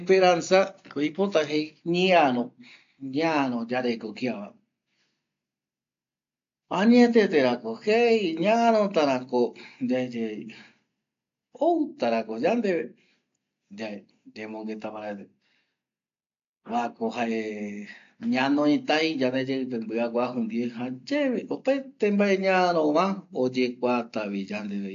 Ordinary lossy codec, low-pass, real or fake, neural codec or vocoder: AAC, 48 kbps; 7.2 kHz; real; none